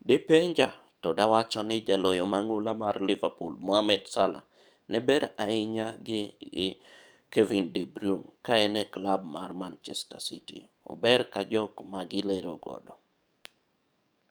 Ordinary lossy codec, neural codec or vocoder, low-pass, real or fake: none; codec, 44.1 kHz, 7.8 kbps, DAC; none; fake